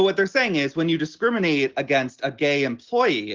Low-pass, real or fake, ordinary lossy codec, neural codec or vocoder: 7.2 kHz; real; Opus, 16 kbps; none